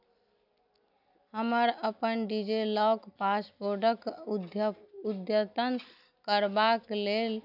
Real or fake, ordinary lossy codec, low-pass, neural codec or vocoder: real; none; 5.4 kHz; none